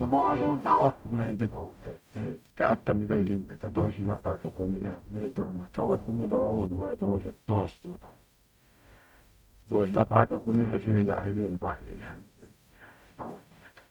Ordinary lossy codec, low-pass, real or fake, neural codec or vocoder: none; 19.8 kHz; fake; codec, 44.1 kHz, 0.9 kbps, DAC